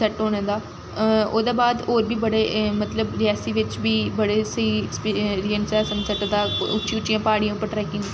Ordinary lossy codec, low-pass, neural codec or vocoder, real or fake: none; none; none; real